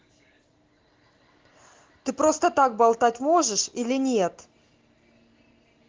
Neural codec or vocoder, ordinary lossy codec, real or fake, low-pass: none; Opus, 16 kbps; real; 7.2 kHz